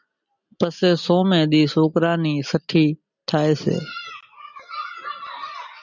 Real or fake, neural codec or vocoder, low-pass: real; none; 7.2 kHz